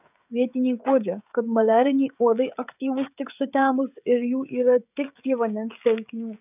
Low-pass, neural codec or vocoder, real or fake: 3.6 kHz; codec, 16 kHz, 16 kbps, FreqCodec, smaller model; fake